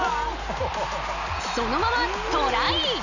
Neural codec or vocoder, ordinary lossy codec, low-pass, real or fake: none; none; 7.2 kHz; real